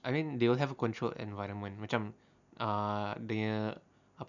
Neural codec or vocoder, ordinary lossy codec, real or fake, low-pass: none; none; real; 7.2 kHz